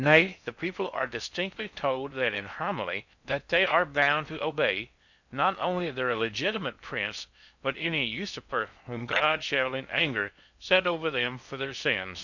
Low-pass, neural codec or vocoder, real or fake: 7.2 kHz; codec, 16 kHz in and 24 kHz out, 0.6 kbps, FocalCodec, streaming, 4096 codes; fake